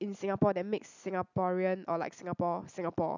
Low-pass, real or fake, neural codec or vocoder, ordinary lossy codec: 7.2 kHz; real; none; none